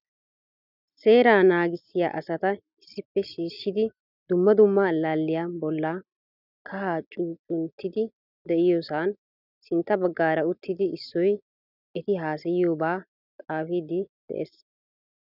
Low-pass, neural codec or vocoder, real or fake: 5.4 kHz; none; real